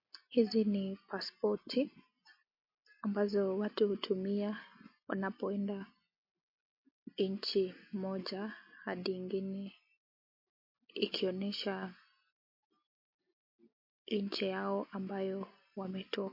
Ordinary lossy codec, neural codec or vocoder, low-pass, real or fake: MP3, 32 kbps; none; 5.4 kHz; real